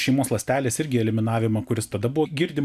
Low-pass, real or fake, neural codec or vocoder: 14.4 kHz; real; none